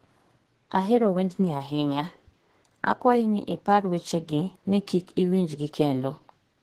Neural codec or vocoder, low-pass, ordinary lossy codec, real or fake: codec, 32 kHz, 1.9 kbps, SNAC; 14.4 kHz; Opus, 16 kbps; fake